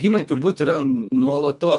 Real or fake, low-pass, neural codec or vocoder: fake; 10.8 kHz; codec, 24 kHz, 1.5 kbps, HILCodec